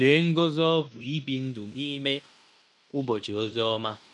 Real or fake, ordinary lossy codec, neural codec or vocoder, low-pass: fake; none; codec, 16 kHz in and 24 kHz out, 0.9 kbps, LongCat-Audio-Codec, fine tuned four codebook decoder; 10.8 kHz